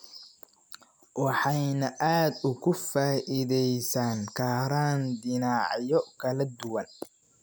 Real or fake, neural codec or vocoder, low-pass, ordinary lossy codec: real; none; none; none